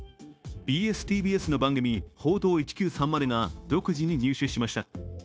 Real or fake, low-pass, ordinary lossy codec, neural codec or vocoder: fake; none; none; codec, 16 kHz, 0.9 kbps, LongCat-Audio-Codec